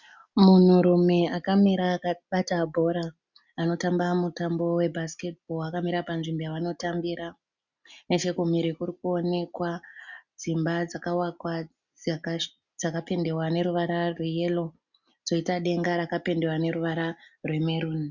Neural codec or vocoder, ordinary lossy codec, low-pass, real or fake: none; Opus, 64 kbps; 7.2 kHz; real